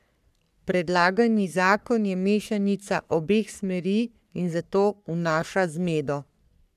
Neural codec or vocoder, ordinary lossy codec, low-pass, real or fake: codec, 44.1 kHz, 3.4 kbps, Pupu-Codec; none; 14.4 kHz; fake